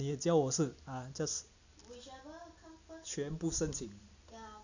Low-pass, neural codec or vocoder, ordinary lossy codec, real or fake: 7.2 kHz; none; none; real